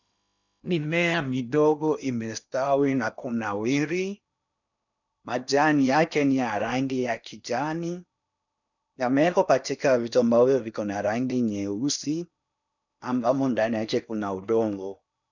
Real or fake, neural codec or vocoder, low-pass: fake; codec, 16 kHz in and 24 kHz out, 0.8 kbps, FocalCodec, streaming, 65536 codes; 7.2 kHz